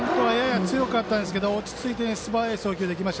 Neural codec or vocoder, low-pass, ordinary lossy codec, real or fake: none; none; none; real